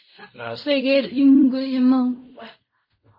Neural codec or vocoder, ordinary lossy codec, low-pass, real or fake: codec, 16 kHz in and 24 kHz out, 0.4 kbps, LongCat-Audio-Codec, fine tuned four codebook decoder; MP3, 24 kbps; 5.4 kHz; fake